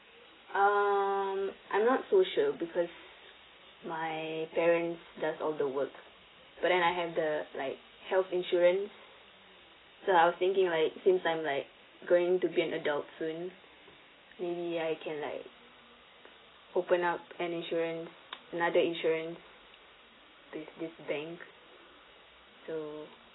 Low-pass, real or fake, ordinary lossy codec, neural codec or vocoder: 7.2 kHz; real; AAC, 16 kbps; none